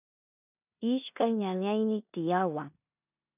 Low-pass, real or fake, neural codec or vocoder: 3.6 kHz; fake; codec, 16 kHz in and 24 kHz out, 0.9 kbps, LongCat-Audio-Codec, four codebook decoder